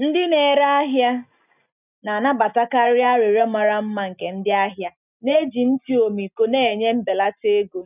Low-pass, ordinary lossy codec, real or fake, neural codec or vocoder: 3.6 kHz; none; real; none